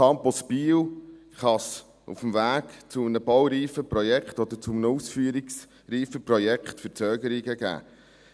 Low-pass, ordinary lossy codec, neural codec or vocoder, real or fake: none; none; none; real